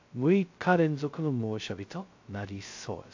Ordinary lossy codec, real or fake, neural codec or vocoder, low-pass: AAC, 48 kbps; fake; codec, 16 kHz, 0.2 kbps, FocalCodec; 7.2 kHz